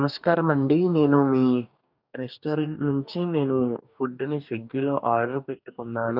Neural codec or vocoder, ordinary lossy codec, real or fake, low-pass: codec, 44.1 kHz, 2.6 kbps, DAC; none; fake; 5.4 kHz